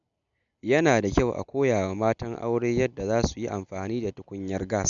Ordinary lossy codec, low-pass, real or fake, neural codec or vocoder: none; 7.2 kHz; real; none